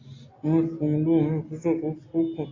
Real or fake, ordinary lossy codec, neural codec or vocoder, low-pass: real; AAC, 32 kbps; none; 7.2 kHz